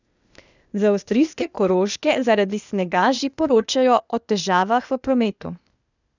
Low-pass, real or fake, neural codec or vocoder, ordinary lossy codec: 7.2 kHz; fake; codec, 16 kHz, 0.8 kbps, ZipCodec; none